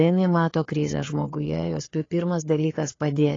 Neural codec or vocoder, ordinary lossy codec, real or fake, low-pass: codec, 16 kHz, 4 kbps, FreqCodec, larger model; AAC, 32 kbps; fake; 7.2 kHz